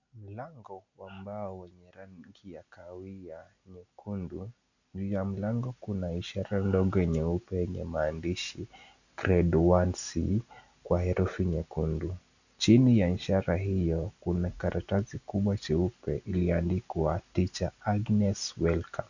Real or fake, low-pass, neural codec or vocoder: real; 7.2 kHz; none